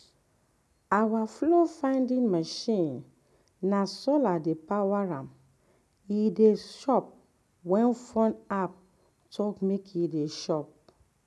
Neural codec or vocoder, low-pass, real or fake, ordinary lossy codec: none; none; real; none